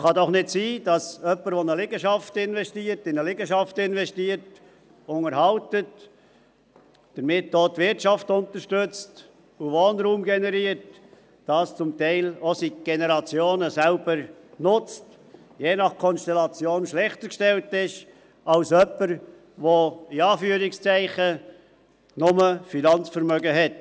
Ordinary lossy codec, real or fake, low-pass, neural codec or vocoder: none; real; none; none